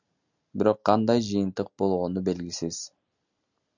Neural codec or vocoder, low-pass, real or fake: none; 7.2 kHz; real